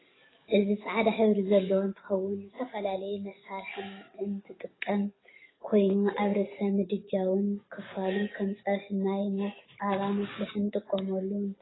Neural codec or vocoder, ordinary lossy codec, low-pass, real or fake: codec, 44.1 kHz, 7.8 kbps, DAC; AAC, 16 kbps; 7.2 kHz; fake